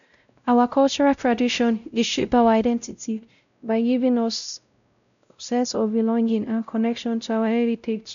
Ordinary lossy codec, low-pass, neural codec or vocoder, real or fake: none; 7.2 kHz; codec, 16 kHz, 0.5 kbps, X-Codec, WavLM features, trained on Multilingual LibriSpeech; fake